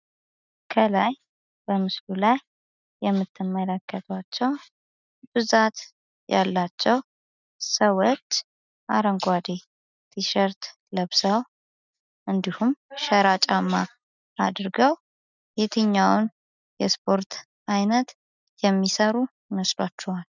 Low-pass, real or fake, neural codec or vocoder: 7.2 kHz; real; none